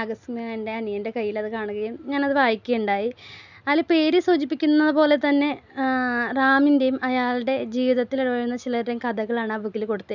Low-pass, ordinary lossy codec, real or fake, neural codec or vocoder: 7.2 kHz; none; real; none